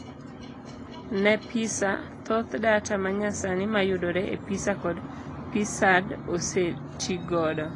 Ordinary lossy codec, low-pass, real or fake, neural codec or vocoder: AAC, 32 kbps; 10.8 kHz; real; none